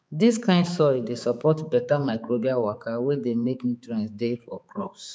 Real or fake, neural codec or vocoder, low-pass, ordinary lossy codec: fake; codec, 16 kHz, 4 kbps, X-Codec, HuBERT features, trained on balanced general audio; none; none